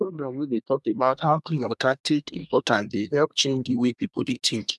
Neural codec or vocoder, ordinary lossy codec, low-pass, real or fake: codec, 24 kHz, 1 kbps, SNAC; none; none; fake